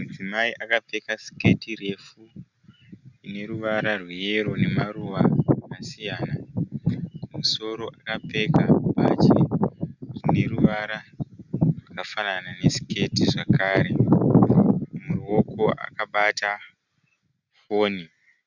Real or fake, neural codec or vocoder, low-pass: real; none; 7.2 kHz